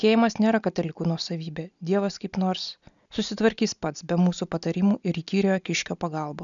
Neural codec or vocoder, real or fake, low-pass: none; real; 7.2 kHz